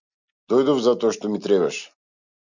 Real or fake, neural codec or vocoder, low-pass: real; none; 7.2 kHz